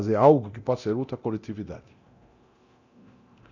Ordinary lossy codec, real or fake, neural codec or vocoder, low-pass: none; fake; codec, 24 kHz, 0.9 kbps, DualCodec; 7.2 kHz